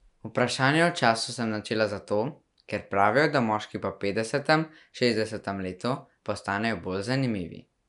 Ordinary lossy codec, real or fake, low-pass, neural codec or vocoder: none; real; 10.8 kHz; none